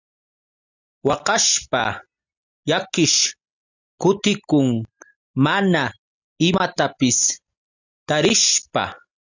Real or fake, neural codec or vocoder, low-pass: real; none; 7.2 kHz